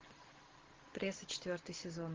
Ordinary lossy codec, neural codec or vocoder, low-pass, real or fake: Opus, 16 kbps; none; 7.2 kHz; real